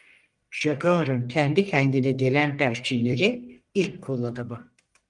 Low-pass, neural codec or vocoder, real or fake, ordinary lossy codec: 10.8 kHz; codec, 44.1 kHz, 1.7 kbps, Pupu-Codec; fake; Opus, 32 kbps